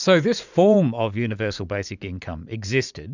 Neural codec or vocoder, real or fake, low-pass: vocoder, 44.1 kHz, 80 mel bands, Vocos; fake; 7.2 kHz